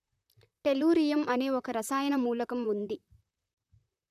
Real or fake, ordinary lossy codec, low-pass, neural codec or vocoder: fake; none; 14.4 kHz; vocoder, 44.1 kHz, 128 mel bands, Pupu-Vocoder